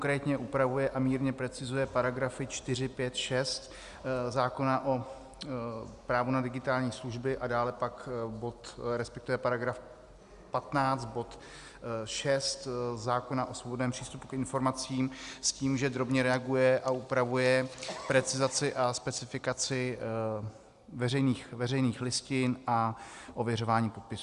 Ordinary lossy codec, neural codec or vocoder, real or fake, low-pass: Opus, 64 kbps; none; real; 10.8 kHz